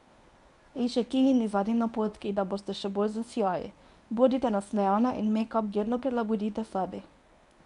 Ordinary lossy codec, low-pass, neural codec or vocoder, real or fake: none; 10.8 kHz; codec, 24 kHz, 0.9 kbps, WavTokenizer, medium speech release version 1; fake